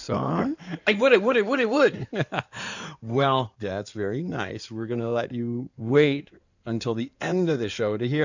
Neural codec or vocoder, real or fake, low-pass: codec, 16 kHz in and 24 kHz out, 2.2 kbps, FireRedTTS-2 codec; fake; 7.2 kHz